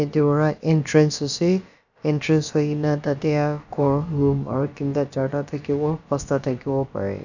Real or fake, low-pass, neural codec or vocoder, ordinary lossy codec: fake; 7.2 kHz; codec, 16 kHz, about 1 kbps, DyCAST, with the encoder's durations; none